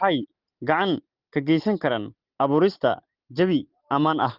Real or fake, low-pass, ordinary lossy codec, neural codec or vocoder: real; 5.4 kHz; Opus, 16 kbps; none